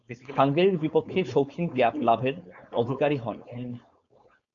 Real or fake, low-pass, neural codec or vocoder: fake; 7.2 kHz; codec, 16 kHz, 4.8 kbps, FACodec